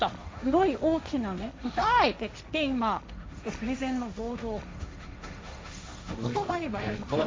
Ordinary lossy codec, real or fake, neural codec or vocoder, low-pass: none; fake; codec, 16 kHz, 1.1 kbps, Voila-Tokenizer; none